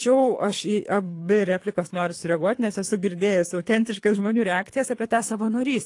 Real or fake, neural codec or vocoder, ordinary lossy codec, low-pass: fake; codec, 24 kHz, 3 kbps, HILCodec; AAC, 48 kbps; 10.8 kHz